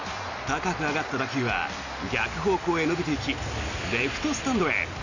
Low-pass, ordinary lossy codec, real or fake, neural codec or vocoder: 7.2 kHz; none; real; none